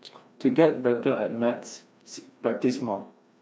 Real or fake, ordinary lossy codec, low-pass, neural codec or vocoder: fake; none; none; codec, 16 kHz, 1 kbps, FreqCodec, larger model